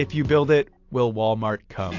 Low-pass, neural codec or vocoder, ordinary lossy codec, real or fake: 7.2 kHz; none; AAC, 48 kbps; real